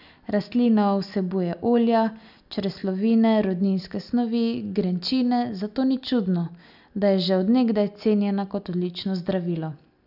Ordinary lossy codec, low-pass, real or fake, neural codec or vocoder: none; 5.4 kHz; real; none